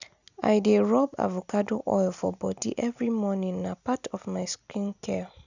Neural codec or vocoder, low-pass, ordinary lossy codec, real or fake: none; 7.2 kHz; none; real